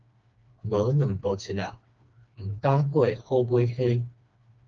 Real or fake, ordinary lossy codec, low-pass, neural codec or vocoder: fake; Opus, 24 kbps; 7.2 kHz; codec, 16 kHz, 2 kbps, FreqCodec, smaller model